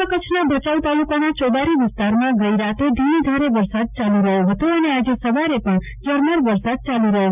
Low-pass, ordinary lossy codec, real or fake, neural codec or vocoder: 3.6 kHz; none; real; none